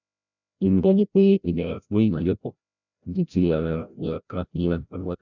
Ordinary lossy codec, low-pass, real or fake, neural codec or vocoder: none; 7.2 kHz; fake; codec, 16 kHz, 0.5 kbps, FreqCodec, larger model